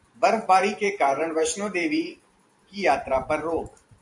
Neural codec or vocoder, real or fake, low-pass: vocoder, 44.1 kHz, 128 mel bands every 512 samples, BigVGAN v2; fake; 10.8 kHz